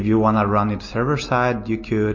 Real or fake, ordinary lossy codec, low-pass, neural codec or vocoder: real; MP3, 32 kbps; 7.2 kHz; none